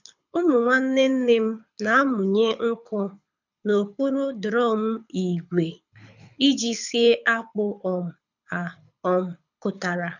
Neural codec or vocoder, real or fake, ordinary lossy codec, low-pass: codec, 24 kHz, 6 kbps, HILCodec; fake; none; 7.2 kHz